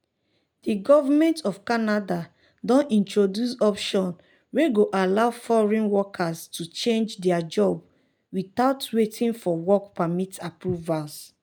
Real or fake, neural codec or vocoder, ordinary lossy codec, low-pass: real; none; none; none